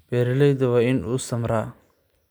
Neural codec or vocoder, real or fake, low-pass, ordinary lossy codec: none; real; none; none